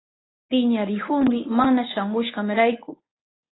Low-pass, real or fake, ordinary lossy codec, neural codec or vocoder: 7.2 kHz; fake; AAC, 16 kbps; codec, 24 kHz, 0.9 kbps, WavTokenizer, medium speech release version 1